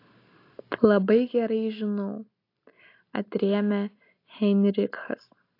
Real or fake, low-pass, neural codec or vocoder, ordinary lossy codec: real; 5.4 kHz; none; AAC, 32 kbps